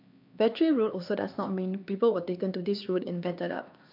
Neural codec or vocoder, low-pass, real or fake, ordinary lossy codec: codec, 16 kHz, 2 kbps, X-Codec, HuBERT features, trained on LibriSpeech; 5.4 kHz; fake; MP3, 48 kbps